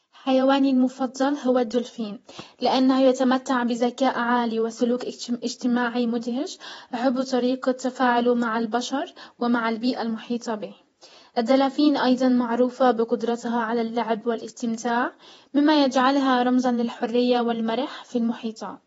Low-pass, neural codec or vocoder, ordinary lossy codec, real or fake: 19.8 kHz; vocoder, 48 kHz, 128 mel bands, Vocos; AAC, 24 kbps; fake